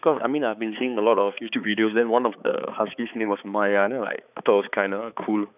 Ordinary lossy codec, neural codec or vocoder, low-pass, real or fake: none; codec, 16 kHz, 4 kbps, X-Codec, HuBERT features, trained on balanced general audio; 3.6 kHz; fake